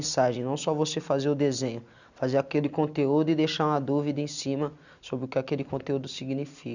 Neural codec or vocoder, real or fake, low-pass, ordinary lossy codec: none; real; 7.2 kHz; none